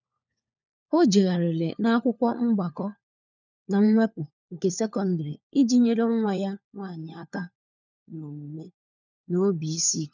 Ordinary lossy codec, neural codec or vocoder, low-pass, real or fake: none; codec, 16 kHz, 4 kbps, FunCodec, trained on LibriTTS, 50 frames a second; 7.2 kHz; fake